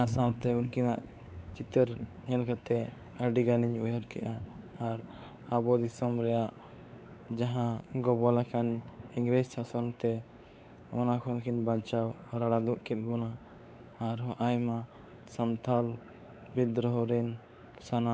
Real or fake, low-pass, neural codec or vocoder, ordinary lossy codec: fake; none; codec, 16 kHz, 4 kbps, X-Codec, WavLM features, trained on Multilingual LibriSpeech; none